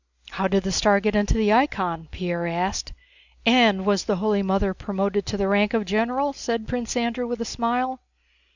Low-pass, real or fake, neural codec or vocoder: 7.2 kHz; real; none